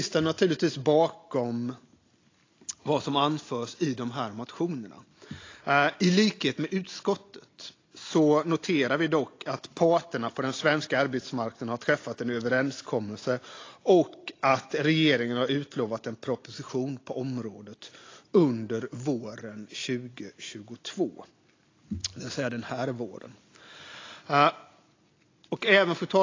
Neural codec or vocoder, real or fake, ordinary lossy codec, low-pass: none; real; AAC, 32 kbps; 7.2 kHz